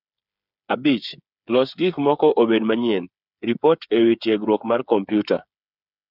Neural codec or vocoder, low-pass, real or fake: codec, 16 kHz, 8 kbps, FreqCodec, smaller model; 5.4 kHz; fake